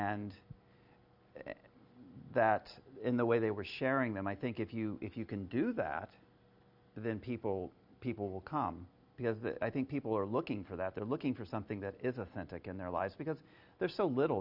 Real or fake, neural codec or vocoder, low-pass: real; none; 5.4 kHz